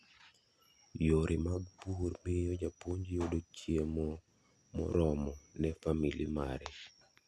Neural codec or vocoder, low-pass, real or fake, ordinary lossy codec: none; none; real; none